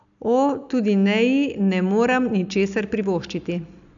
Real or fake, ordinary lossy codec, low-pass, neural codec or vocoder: real; none; 7.2 kHz; none